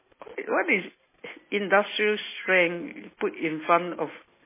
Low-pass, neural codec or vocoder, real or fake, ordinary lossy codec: 3.6 kHz; none; real; MP3, 16 kbps